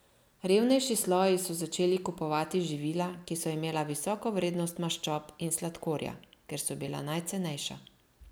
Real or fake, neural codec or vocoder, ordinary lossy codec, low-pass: real; none; none; none